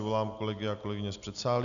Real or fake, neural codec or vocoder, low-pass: real; none; 7.2 kHz